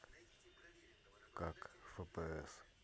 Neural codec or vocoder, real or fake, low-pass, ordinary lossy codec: none; real; none; none